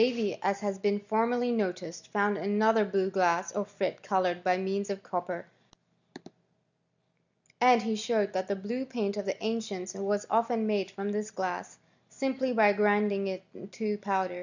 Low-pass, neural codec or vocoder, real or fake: 7.2 kHz; none; real